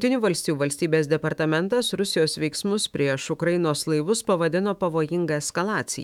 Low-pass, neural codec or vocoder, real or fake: 19.8 kHz; autoencoder, 48 kHz, 128 numbers a frame, DAC-VAE, trained on Japanese speech; fake